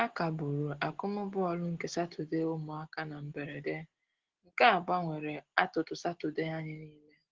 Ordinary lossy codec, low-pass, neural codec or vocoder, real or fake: Opus, 16 kbps; 7.2 kHz; none; real